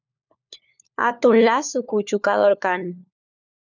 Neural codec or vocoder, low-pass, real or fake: codec, 16 kHz, 4 kbps, FunCodec, trained on LibriTTS, 50 frames a second; 7.2 kHz; fake